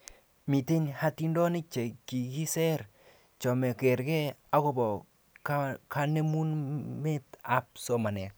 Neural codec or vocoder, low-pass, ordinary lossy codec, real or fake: none; none; none; real